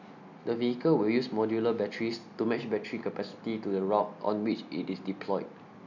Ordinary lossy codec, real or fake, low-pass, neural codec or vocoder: none; real; 7.2 kHz; none